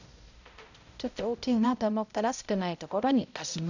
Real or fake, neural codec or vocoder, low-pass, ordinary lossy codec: fake; codec, 16 kHz, 0.5 kbps, X-Codec, HuBERT features, trained on balanced general audio; 7.2 kHz; none